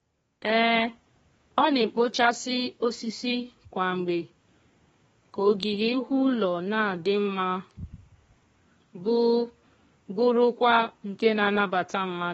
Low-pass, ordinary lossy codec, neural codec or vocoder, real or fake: 14.4 kHz; AAC, 24 kbps; codec, 32 kHz, 1.9 kbps, SNAC; fake